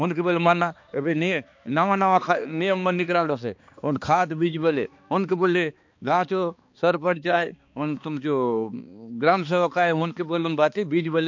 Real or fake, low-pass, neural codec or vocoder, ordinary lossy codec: fake; 7.2 kHz; codec, 16 kHz, 2 kbps, X-Codec, HuBERT features, trained on balanced general audio; MP3, 48 kbps